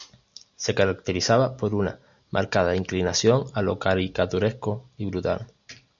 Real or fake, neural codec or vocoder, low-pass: real; none; 7.2 kHz